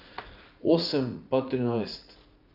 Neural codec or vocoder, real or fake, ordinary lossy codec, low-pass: vocoder, 44.1 kHz, 80 mel bands, Vocos; fake; none; 5.4 kHz